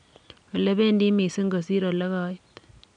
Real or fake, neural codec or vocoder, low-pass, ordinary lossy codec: real; none; 9.9 kHz; none